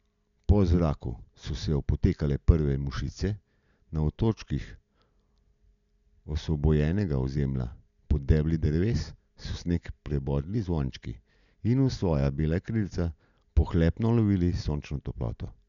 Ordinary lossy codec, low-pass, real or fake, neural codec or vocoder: none; 7.2 kHz; real; none